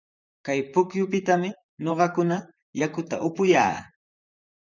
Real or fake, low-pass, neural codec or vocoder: fake; 7.2 kHz; vocoder, 44.1 kHz, 128 mel bands, Pupu-Vocoder